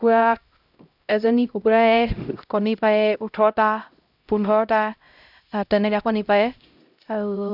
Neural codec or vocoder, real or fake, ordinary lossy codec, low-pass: codec, 16 kHz, 0.5 kbps, X-Codec, HuBERT features, trained on LibriSpeech; fake; none; 5.4 kHz